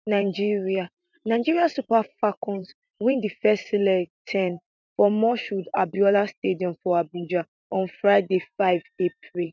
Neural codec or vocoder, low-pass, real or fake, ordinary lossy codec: vocoder, 44.1 kHz, 128 mel bands every 512 samples, BigVGAN v2; 7.2 kHz; fake; none